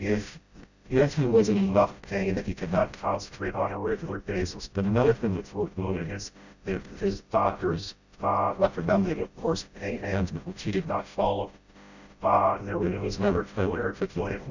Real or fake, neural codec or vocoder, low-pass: fake; codec, 16 kHz, 0.5 kbps, FreqCodec, smaller model; 7.2 kHz